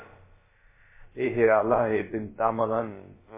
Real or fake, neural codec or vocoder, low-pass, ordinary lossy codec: fake; codec, 16 kHz, about 1 kbps, DyCAST, with the encoder's durations; 3.6 kHz; MP3, 16 kbps